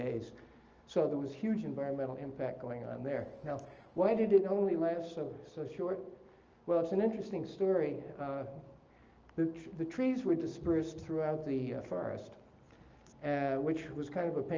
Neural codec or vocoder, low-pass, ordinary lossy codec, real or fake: none; 7.2 kHz; Opus, 24 kbps; real